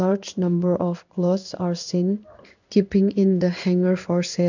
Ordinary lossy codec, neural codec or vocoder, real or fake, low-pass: none; codec, 16 kHz in and 24 kHz out, 1 kbps, XY-Tokenizer; fake; 7.2 kHz